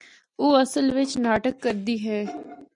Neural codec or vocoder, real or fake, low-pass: none; real; 10.8 kHz